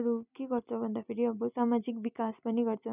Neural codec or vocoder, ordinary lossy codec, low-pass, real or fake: none; none; 3.6 kHz; real